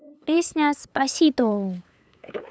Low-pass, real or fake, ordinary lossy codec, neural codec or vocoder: none; fake; none; codec, 16 kHz, 8 kbps, FunCodec, trained on LibriTTS, 25 frames a second